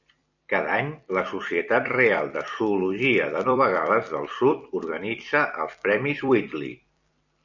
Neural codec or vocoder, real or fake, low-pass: vocoder, 44.1 kHz, 128 mel bands every 256 samples, BigVGAN v2; fake; 7.2 kHz